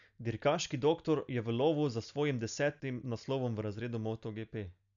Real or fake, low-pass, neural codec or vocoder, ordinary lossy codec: real; 7.2 kHz; none; none